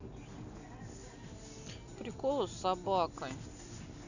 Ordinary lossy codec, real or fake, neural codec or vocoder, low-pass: none; real; none; 7.2 kHz